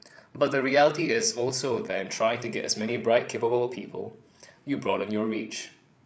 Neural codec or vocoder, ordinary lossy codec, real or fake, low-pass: codec, 16 kHz, 8 kbps, FreqCodec, larger model; none; fake; none